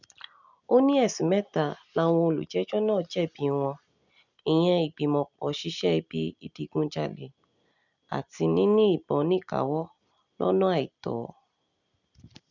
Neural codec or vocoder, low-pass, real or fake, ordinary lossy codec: none; 7.2 kHz; real; none